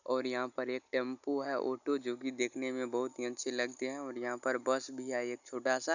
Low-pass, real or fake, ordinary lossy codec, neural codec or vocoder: 7.2 kHz; real; none; none